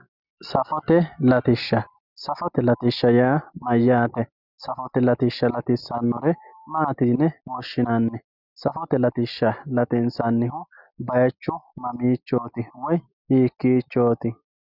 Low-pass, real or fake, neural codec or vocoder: 5.4 kHz; real; none